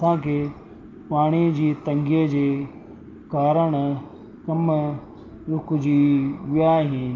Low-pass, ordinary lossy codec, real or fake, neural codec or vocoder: 7.2 kHz; Opus, 24 kbps; real; none